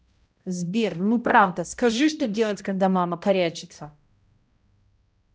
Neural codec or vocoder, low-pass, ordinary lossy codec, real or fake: codec, 16 kHz, 0.5 kbps, X-Codec, HuBERT features, trained on balanced general audio; none; none; fake